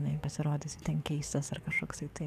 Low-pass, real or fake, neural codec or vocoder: 14.4 kHz; fake; codec, 44.1 kHz, 7.8 kbps, DAC